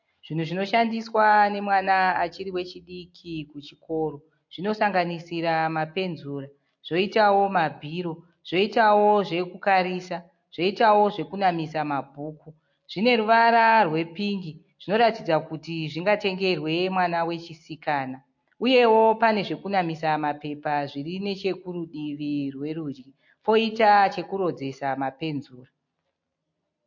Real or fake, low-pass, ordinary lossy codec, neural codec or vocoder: real; 7.2 kHz; MP3, 48 kbps; none